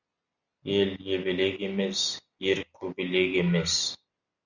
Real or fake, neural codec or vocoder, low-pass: real; none; 7.2 kHz